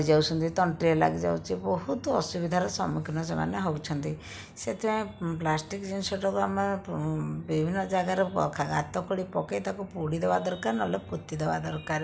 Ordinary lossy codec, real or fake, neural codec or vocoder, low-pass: none; real; none; none